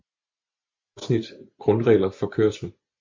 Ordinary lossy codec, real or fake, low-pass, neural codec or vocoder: MP3, 48 kbps; real; 7.2 kHz; none